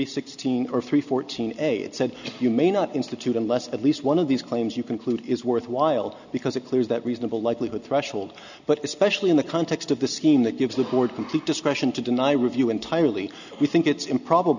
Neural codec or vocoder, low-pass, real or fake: none; 7.2 kHz; real